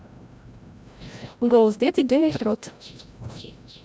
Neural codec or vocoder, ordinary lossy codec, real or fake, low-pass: codec, 16 kHz, 0.5 kbps, FreqCodec, larger model; none; fake; none